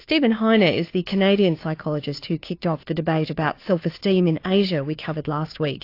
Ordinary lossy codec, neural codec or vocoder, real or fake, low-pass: AAC, 32 kbps; codec, 16 kHz, 2 kbps, FunCodec, trained on Chinese and English, 25 frames a second; fake; 5.4 kHz